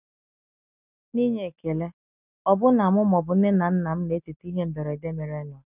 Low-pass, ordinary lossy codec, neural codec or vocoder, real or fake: 3.6 kHz; none; none; real